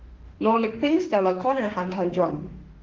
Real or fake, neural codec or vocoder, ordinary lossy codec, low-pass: fake; autoencoder, 48 kHz, 32 numbers a frame, DAC-VAE, trained on Japanese speech; Opus, 16 kbps; 7.2 kHz